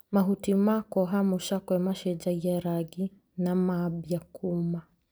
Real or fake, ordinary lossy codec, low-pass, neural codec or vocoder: real; none; none; none